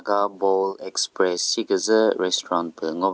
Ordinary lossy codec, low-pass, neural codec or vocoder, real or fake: none; none; none; real